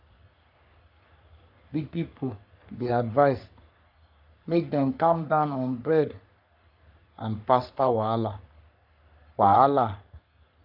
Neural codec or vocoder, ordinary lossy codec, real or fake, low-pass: codec, 44.1 kHz, 3.4 kbps, Pupu-Codec; none; fake; 5.4 kHz